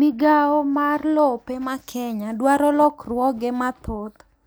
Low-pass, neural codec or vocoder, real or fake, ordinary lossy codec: none; none; real; none